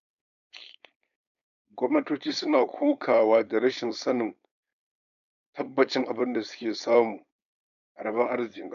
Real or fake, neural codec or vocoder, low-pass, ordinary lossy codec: fake; codec, 16 kHz, 4.8 kbps, FACodec; 7.2 kHz; none